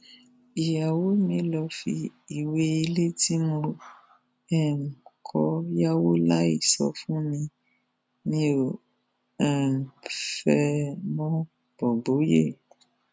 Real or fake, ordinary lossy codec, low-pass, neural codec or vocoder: real; none; none; none